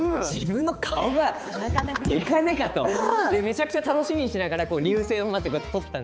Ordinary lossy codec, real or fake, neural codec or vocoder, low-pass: none; fake; codec, 16 kHz, 4 kbps, X-Codec, HuBERT features, trained on balanced general audio; none